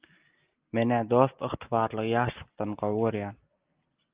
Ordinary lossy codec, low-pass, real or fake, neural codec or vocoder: Opus, 32 kbps; 3.6 kHz; real; none